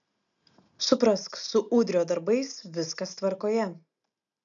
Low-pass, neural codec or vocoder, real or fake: 7.2 kHz; none; real